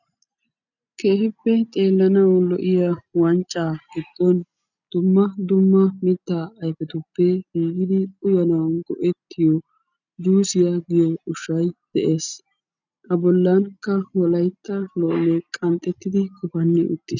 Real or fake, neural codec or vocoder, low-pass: real; none; 7.2 kHz